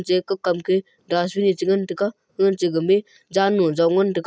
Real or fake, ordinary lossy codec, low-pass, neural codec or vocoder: real; none; none; none